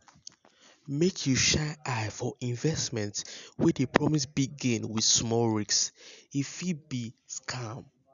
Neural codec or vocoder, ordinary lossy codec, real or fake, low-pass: none; none; real; 7.2 kHz